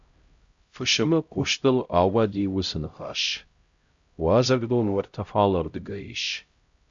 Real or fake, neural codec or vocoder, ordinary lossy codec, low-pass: fake; codec, 16 kHz, 0.5 kbps, X-Codec, HuBERT features, trained on LibriSpeech; Opus, 64 kbps; 7.2 kHz